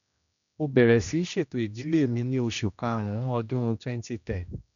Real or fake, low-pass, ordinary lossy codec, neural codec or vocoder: fake; 7.2 kHz; none; codec, 16 kHz, 1 kbps, X-Codec, HuBERT features, trained on general audio